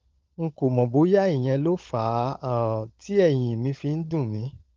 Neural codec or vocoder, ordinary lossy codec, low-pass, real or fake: codec, 16 kHz, 16 kbps, FunCodec, trained on LibriTTS, 50 frames a second; Opus, 32 kbps; 7.2 kHz; fake